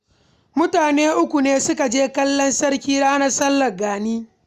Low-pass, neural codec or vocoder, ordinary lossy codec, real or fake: 14.4 kHz; none; Opus, 64 kbps; real